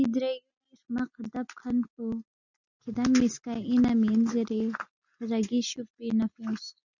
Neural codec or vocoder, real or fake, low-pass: none; real; 7.2 kHz